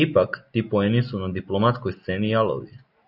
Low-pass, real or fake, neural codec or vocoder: 5.4 kHz; real; none